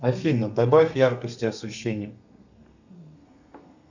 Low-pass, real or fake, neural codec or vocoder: 7.2 kHz; fake; codec, 32 kHz, 1.9 kbps, SNAC